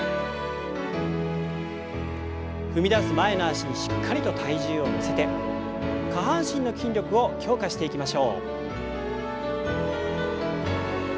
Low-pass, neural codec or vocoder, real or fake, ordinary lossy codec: none; none; real; none